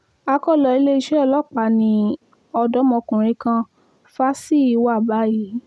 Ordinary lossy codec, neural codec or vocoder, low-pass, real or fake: none; none; none; real